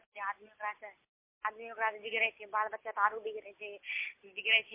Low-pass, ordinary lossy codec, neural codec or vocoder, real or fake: 3.6 kHz; MP3, 24 kbps; none; real